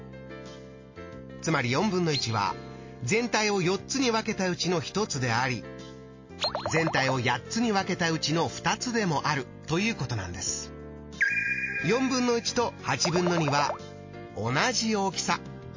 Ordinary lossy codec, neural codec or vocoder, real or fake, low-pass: MP3, 32 kbps; none; real; 7.2 kHz